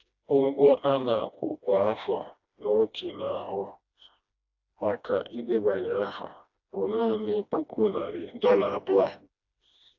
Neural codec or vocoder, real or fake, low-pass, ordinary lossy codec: codec, 16 kHz, 1 kbps, FreqCodec, smaller model; fake; 7.2 kHz; AAC, 48 kbps